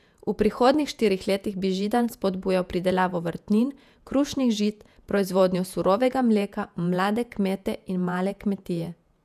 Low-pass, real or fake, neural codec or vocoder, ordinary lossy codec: 14.4 kHz; fake; vocoder, 48 kHz, 128 mel bands, Vocos; none